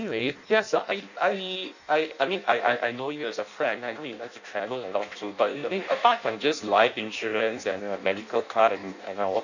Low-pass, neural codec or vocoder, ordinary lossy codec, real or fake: 7.2 kHz; codec, 16 kHz in and 24 kHz out, 0.6 kbps, FireRedTTS-2 codec; none; fake